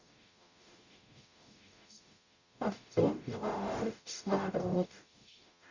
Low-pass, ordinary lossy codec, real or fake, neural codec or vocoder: 7.2 kHz; Opus, 64 kbps; fake; codec, 44.1 kHz, 0.9 kbps, DAC